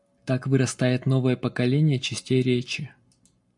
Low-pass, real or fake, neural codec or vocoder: 10.8 kHz; real; none